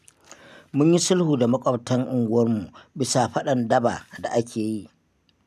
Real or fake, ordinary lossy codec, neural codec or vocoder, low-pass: real; none; none; 14.4 kHz